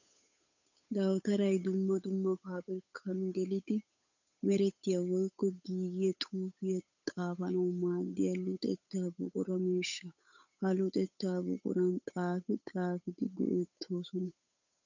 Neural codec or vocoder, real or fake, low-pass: codec, 16 kHz, 8 kbps, FunCodec, trained on Chinese and English, 25 frames a second; fake; 7.2 kHz